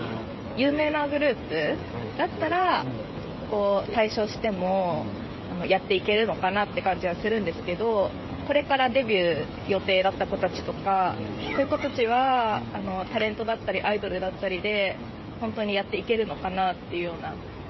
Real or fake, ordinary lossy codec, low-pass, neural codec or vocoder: fake; MP3, 24 kbps; 7.2 kHz; codec, 16 kHz, 16 kbps, FreqCodec, smaller model